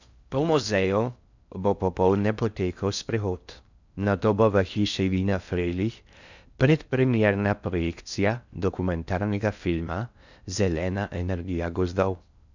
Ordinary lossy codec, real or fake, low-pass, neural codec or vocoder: none; fake; 7.2 kHz; codec, 16 kHz in and 24 kHz out, 0.6 kbps, FocalCodec, streaming, 4096 codes